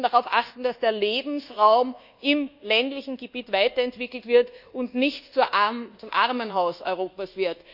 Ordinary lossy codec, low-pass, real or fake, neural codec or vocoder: none; 5.4 kHz; fake; codec, 24 kHz, 1.2 kbps, DualCodec